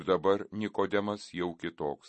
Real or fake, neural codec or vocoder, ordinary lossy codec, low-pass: real; none; MP3, 32 kbps; 10.8 kHz